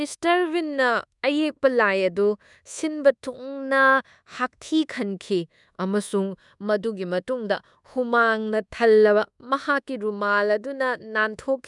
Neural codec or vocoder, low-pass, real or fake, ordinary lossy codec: codec, 24 kHz, 1.2 kbps, DualCodec; 10.8 kHz; fake; none